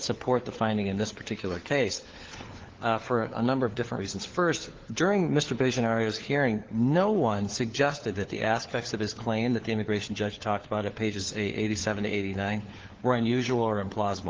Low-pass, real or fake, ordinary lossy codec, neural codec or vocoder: 7.2 kHz; fake; Opus, 16 kbps; codec, 16 kHz, 4 kbps, FunCodec, trained on LibriTTS, 50 frames a second